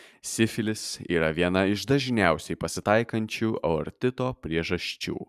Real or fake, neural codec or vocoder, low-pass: fake; vocoder, 44.1 kHz, 128 mel bands every 512 samples, BigVGAN v2; 14.4 kHz